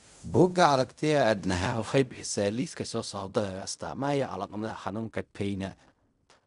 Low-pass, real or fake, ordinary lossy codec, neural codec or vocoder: 10.8 kHz; fake; none; codec, 16 kHz in and 24 kHz out, 0.4 kbps, LongCat-Audio-Codec, fine tuned four codebook decoder